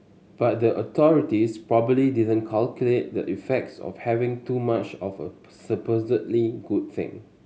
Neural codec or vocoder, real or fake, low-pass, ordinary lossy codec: none; real; none; none